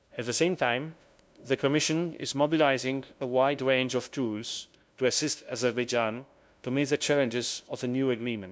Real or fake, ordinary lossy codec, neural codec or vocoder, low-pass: fake; none; codec, 16 kHz, 0.5 kbps, FunCodec, trained on LibriTTS, 25 frames a second; none